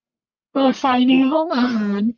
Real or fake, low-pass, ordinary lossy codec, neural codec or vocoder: fake; 7.2 kHz; none; codec, 44.1 kHz, 1.7 kbps, Pupu-Codec